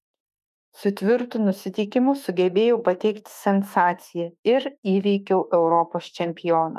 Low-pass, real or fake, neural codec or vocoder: 14.4 kHz; fake; autoencoder, 48 kHz, 32 numbers a frame, DAC-VAE, trained on Japanese speech